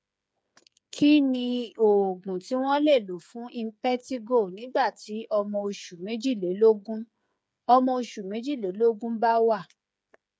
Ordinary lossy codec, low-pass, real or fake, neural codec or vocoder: none; none; fake; codec, 16 kHz, 8 kbps, FreqCodec, smaller model